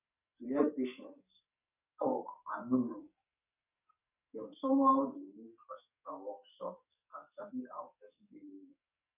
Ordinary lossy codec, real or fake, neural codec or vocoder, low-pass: none; fake; codec, 44.1 kHz, 2.6 kbps, SNAC; 3.6 kHz